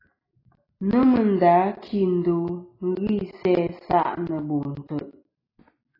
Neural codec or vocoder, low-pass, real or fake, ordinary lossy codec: none; 5.4 kHz; real; AAC, 24 kbps